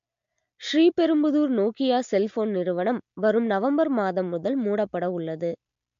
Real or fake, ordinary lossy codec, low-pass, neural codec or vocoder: real; MP3, 48 kbps; 7.2 kHz; none